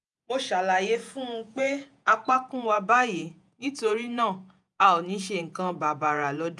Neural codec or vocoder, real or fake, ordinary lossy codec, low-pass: vocoder, 48 kHz, 128 mel bands, Vocos; fake; none; 10.8 kHz